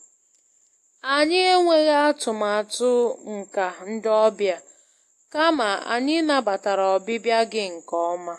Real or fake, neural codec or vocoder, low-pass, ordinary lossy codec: real; none; 14.4 kHz; AAC, 64 kbps